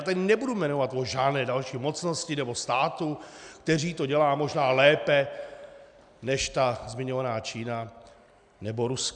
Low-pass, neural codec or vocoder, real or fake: 9.9 kHz; none; real